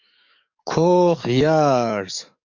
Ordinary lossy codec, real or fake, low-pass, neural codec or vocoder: MP3, 64 kbps; fake; 7.2 kHz; codec, 44.1 kHz, 7.8 kbps, DAC